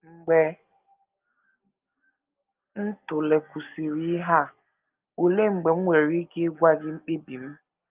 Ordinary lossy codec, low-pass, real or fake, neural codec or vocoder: Opus, 32 kbps; 3.6 kHz; real; none